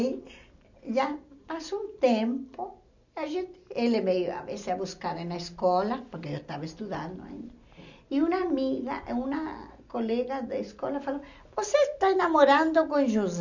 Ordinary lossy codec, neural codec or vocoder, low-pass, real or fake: none; none; 7.2 kHz; real